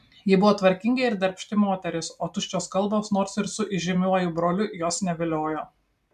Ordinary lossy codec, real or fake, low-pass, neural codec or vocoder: MP3, 96 kbps; real; 14.4 kHz; none